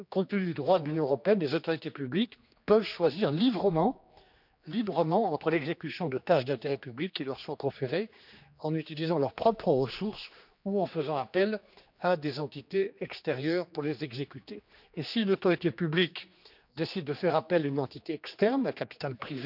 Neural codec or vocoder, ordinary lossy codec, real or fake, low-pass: codec, 16 kHz, 2 kbps, X-Codec, HuBERT features, trained on general audio; none; fake; 5.4 kHz